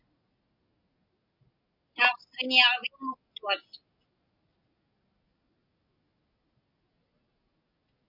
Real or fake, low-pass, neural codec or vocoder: real; 5.4 kHz; none